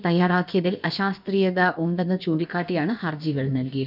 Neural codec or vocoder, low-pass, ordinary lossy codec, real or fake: codec, 16 kHz, about 1 kbps, DyCAST, with the encoder's durations; 5.4 kHz; none; fake